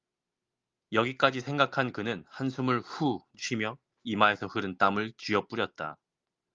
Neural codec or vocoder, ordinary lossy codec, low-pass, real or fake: none; Opus, 32 kbps; 7.2 kHz; real